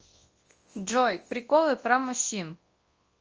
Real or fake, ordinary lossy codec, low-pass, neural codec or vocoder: fake; Opus, 24 kbps; 7.2 kHz; codec, 24 kHz, 0.9 kbps, WavTokenizer, large speech release